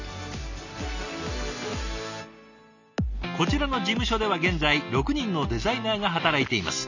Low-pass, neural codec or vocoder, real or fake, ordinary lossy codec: 7.2 kHz; none; real; none